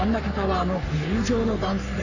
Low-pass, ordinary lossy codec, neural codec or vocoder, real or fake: 7.2 kHz; none; codec, 44.1 kHz, 3.4 kbps, Pupu-Codec; fake